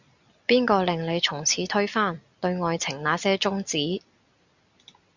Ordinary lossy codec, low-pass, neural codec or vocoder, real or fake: Opus, 64 kbps; 7.2 kHz; none; real